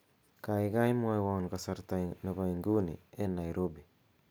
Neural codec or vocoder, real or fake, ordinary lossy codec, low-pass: none; real; none; none